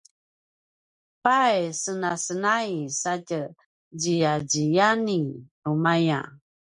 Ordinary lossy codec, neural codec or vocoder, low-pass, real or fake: MP3, 96 kbps; none; 10.8 kHz; real